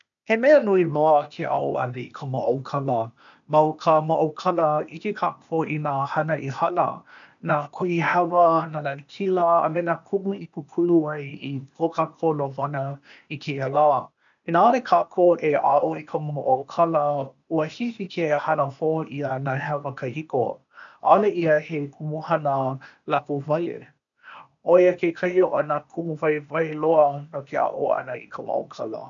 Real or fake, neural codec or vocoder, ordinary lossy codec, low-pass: fake; codec, 16 kHz, 0.8 kbps, ZipCodec; MP3, 96 kbps; 7.2 kHz